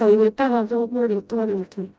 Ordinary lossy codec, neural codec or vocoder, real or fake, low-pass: none; codec, 16 kHz, 0.5 kbps, FreqCodec, smaller model; fake; none